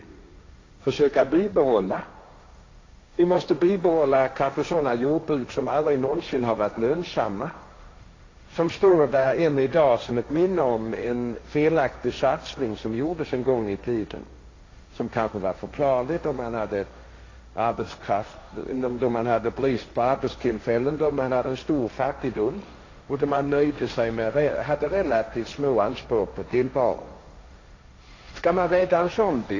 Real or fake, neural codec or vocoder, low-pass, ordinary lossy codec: fake; codec, 16 kHz, 1.1 kbps, Voila-Tokenizer; 7.2 kHz; AAC, 32 kbps